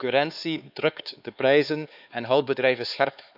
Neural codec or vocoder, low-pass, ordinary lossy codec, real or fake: codec, 16 kHz, 4 kbps, X-Codec, HuBERT features, trained on LibriSpeech; 5.4 kHz; none; fake